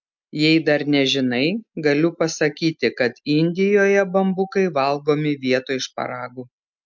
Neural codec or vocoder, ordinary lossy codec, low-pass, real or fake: none; MP3, 64 kbps; 7.2 kHz; real